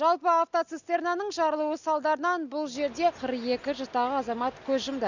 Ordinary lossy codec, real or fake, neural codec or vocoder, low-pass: Opus, 64 kbps; real; none; 7.2 kHz